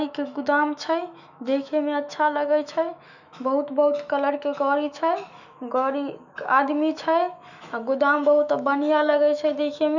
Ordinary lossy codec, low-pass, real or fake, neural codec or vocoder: none; 7.2 kHz; fake; autoencoder, 48 kHz, 128 numbers a frame, DAC-VAE, trained on Japanese speech